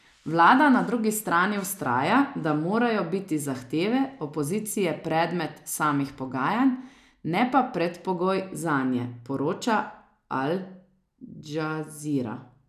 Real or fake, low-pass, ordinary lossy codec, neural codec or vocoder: real; 14.4 kHz; none; none